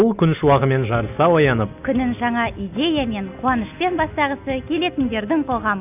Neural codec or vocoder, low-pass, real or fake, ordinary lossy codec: none; 3.6 kHz; real; none